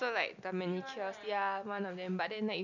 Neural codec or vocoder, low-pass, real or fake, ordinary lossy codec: none; 7.2 kHz; real; none